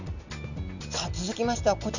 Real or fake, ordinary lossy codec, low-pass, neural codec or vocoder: real; none; 7.2 kHz; none